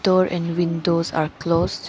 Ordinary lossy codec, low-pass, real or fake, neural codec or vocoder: none; none; real; none